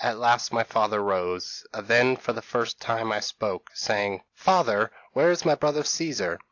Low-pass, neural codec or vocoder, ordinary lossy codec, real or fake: 7.2 kHz; none; AAC, 48 kbps; real